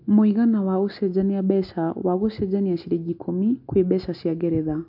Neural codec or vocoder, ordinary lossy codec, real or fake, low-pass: none; AAC, 48 kbps; real; 5.4 kHz